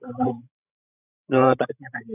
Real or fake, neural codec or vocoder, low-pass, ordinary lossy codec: fake; codec, 16 kHz, 16 kbps, FreqCodec, larger model; 3.6 kHz; none